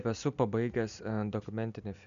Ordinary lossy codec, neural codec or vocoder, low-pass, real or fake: AAC, 96 kbps; none; 7.2 kHz; real